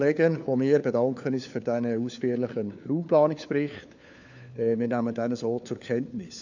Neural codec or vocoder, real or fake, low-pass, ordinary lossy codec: codec, 16 kHz, 4 kbps, FunCodec, trained on LibriTTS, 50 frames a second; fake; 7.2 kHz; none